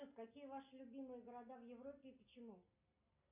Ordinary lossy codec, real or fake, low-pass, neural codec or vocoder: MP3, 32 kbps; real; 3.6 kHz; none